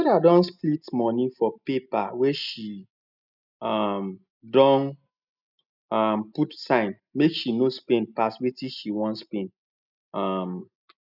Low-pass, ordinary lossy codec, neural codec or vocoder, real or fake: 5.4 kHz; none; none; real